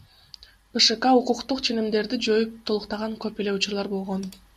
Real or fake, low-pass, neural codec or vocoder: real; 14.4 kHz; none